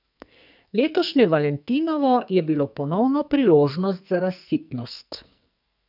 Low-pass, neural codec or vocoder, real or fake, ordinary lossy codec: 5.4 kHz; codec, 44.1 kHz, 2.6 kbps, SNAC; fake; none